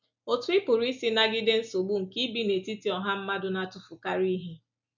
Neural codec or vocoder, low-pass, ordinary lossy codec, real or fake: none; 7.2 kHz; none; real